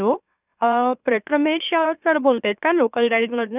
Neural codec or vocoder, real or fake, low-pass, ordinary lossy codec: autoencoder, 44.1 kHz, a latent of 192 numbers a frame, MeloTTS; fake; 3.6 kHz; none